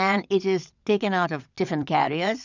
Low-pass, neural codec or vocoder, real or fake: 7.2 kHz; vocoder, 22.05 kHz, 80 mel bands, WaveNeXt; fake